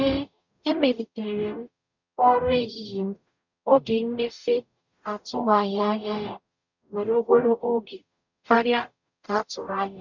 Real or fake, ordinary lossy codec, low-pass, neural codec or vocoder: fake; none; 7.2 kHz; codec, 44.1 kHz, 0.9 kbps, DAC